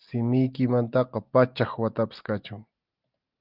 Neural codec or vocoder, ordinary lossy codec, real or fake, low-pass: none; Opus, 32 kbps; real; 5.4 kHz